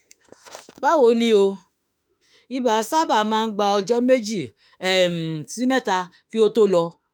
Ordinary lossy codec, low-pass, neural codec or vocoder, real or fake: none; none; autoencoder, 48 kHz, 32 numbers a frame, DAC-VAE, trained on Japanese speech; fake